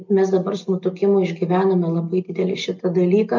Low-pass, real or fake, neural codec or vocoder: 7.2 kHz; real; none